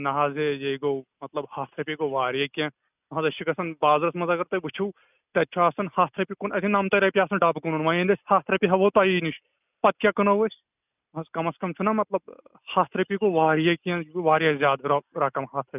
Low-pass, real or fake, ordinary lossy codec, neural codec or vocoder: 3.6 kHz; real; none; none